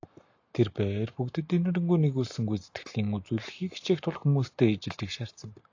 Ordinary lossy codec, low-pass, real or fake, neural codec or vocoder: AAC, 48 kbps; 7.2 kHz; real; none